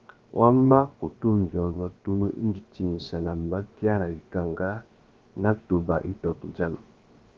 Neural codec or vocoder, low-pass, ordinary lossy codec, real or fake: codec, 16 kHz, 0.7 kbps, FocalCodec; 7.2 kHz; Opus, 32 kbps; fake